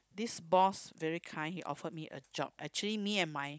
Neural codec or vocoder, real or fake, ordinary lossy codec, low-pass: codec, 16 kHz, 16 kbps, FunCodec, trained on Chinese and English, 50 frames a second; fake; none; none